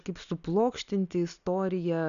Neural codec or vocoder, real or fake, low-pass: none; real; 7.2 kHz